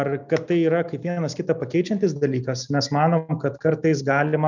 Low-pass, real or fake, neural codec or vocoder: 7.2 kHz; real; none